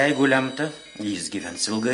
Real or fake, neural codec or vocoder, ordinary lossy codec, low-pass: real; none; MP3, 48 kbps; 10.8 kHz